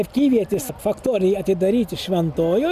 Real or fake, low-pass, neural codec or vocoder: real; 14.4 kHz; none